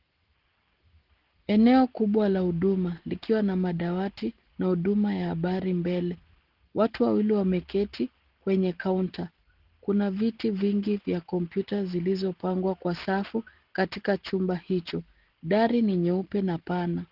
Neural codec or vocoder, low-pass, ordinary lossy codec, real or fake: none; 5.4 kHz; Opus, 16 kbps; real